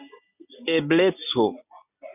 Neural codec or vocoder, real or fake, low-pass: none; real; 3.6 kHz